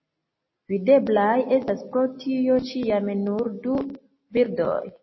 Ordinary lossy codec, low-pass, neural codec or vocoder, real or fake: MP3, 24 kbps; 7.2 kHz; none; real